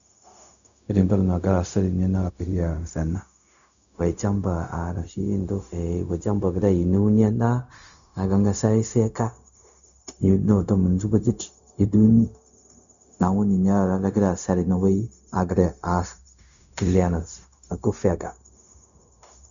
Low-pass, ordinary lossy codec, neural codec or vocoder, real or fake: 7.2 kHz; none; codec, 16 kHz, 0.4 kbps, LongCat-Audio-Codec; fake